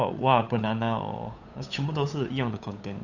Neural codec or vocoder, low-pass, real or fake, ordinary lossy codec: codec, 16 kHz, 8 kbps, FunCodec, trained on Chinese and English, 25 frames a second; 7.2 kHz; fake; none